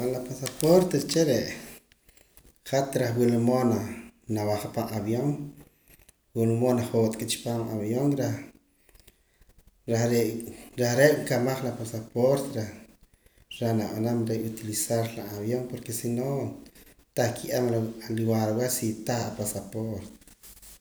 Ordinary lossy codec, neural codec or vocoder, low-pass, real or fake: none; none; none; real